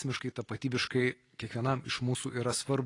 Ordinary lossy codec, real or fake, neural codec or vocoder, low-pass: AAC, 32 kbps; real; none; 10.8 kHz